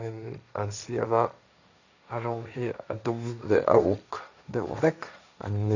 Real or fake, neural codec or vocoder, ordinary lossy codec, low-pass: fake; codec, 16 kHz, 1.1 kbps, Voila-Tokenizer; none; 7.2 kHz